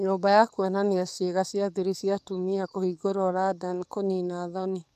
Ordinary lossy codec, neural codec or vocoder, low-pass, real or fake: none; codec, 44.1 kHz, 7.8 kbps, DAC; 14.4 kHz; fake